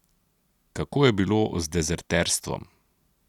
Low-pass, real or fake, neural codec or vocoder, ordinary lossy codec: 19.8 kHz; real; none; none